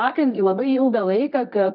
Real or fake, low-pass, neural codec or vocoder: fake; 5.4 kHz; codec, 24 kHz, 0.9 kbps, WavTokenizer, medium music audio release